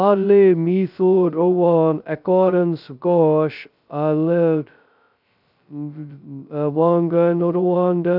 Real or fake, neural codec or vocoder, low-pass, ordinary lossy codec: fake; codec, 16 kHz, 0.2 kbps, FocalCodec; 5.4 kHz; none